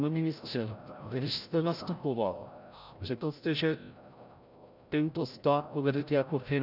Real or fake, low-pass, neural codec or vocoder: fake; 5.4 kHz; codec, 16 kHz, 0.5 kbps, FreqCodec, larger model